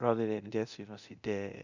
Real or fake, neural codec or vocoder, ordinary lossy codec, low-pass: fake; codec, 24 kHz, 0.9 kbps, DualCodec; none; 7.2 kHz